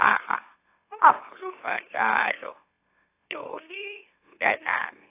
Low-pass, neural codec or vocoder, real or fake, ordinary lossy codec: 3.6 kHz; autoencoder, 44.1 kHz, a latent of 192 numbers a frame, MeloTTS; fake; AAC, 24 kbps